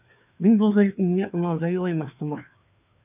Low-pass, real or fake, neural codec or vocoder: 3.6 kHz; fake; codec, 16 kHz, 2 kbps, FreqCodec, larger model